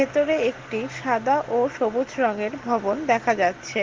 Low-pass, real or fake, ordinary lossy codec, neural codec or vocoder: 7.2 kHz; real; Opus, 16 kbps; none